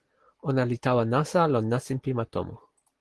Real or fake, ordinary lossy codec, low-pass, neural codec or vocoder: real; Opus, 16 kbps; 10.8 kHz; none